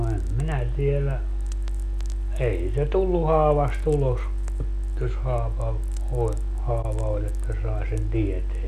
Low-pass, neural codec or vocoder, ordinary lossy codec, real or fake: 14.4 kHz; none; none; real